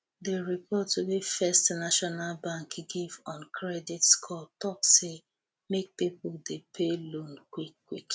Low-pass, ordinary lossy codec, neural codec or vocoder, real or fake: none; none; none; real